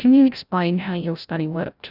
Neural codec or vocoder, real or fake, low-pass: codec, 16 kHz, 0.5 kbps, FreqCodec, larger model; fake; 5.4 kHz